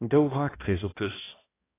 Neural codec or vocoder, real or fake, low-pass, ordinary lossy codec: codec, 16 kHz, 1 kbps, X-Codec, HuBERT features, trained on balanced general audio; fake; 3.6 kHz; AAC, 16 kbps